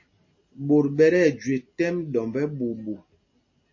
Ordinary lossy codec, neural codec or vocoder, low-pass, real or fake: MP3, 32 kbps; none; 7.2 kHz; real